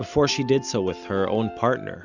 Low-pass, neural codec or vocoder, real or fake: 7.2 kHz; none; real